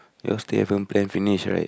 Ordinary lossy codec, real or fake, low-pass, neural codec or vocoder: none; real; none; none